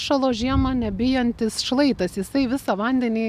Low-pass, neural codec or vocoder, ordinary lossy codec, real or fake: 14.4 kHz; none; MP3, 96 kbps; real